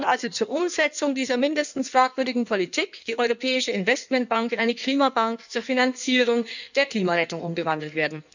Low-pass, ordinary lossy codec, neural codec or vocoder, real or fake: 7.2 kHz; none; codec, 16 kHz in and 24 kHz out, 1.1 kbps, FireRedTTS-2 codec; fake